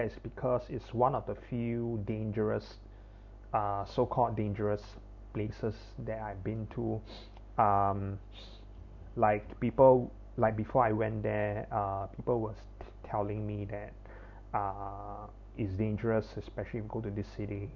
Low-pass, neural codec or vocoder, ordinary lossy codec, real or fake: 7.2 kHz; none; none; real